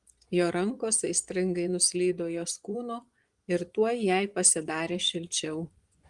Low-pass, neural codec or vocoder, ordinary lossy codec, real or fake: 10.8 kHz; vocoder, 24 kHz, 100 mel bands, Vocos; Opus, 24 kbps; fake